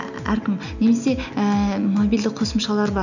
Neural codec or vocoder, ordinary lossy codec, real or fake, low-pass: none; none; real; 7.2 kHz